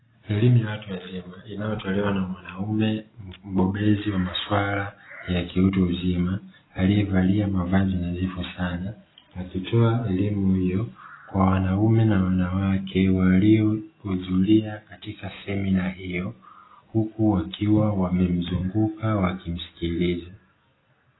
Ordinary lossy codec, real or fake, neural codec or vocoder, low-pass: AAC, 16 kbps; real; none; 7.2 kHz